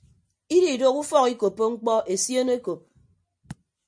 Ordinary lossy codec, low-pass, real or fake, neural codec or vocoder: MP3, 64 kbps; 9.9 kHz; real; none